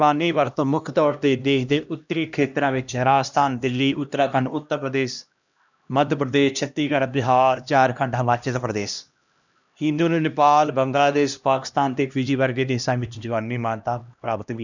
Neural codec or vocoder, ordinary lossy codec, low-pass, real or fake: codec, 16 kHz, 1 kbps, X-Codec, HuBERT features, trained on LibriSpeech; none; 7.2 kHz; fake